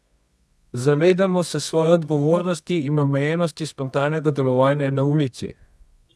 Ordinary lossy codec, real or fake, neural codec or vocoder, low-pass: none; fake; codec, 24 kHz, 0.9 kbps, WavTokenizer, medium music audio release; none